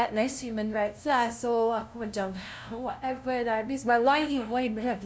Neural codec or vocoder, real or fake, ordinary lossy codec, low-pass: codec, 16 kHz, 0.5 kbps, FunCodec, trained on LibriTTS, 25 frames a second; fake; none; none